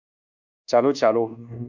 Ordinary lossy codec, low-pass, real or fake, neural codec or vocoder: AAC, 48 kbps; 7.2 kHz; fake; codec, 24 kHz, 1.2 kbps, DualCodec